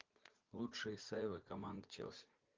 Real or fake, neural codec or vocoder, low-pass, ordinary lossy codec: fake; vocoder, 44.1 kHz, 80 mel bands, Vocos; 7.2 kHz; Opus, 24 kbps